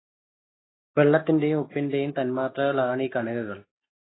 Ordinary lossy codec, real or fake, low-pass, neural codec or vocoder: AAC, 16 kbps; real; 7.2 kHz; none